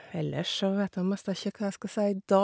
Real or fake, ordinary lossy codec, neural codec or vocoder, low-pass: real; none; none; none